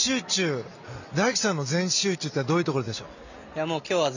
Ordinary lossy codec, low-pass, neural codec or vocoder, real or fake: none; 7.2 kHz; none; real